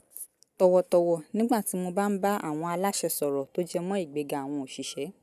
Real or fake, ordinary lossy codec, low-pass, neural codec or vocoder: real; none; 14.4 kHz; none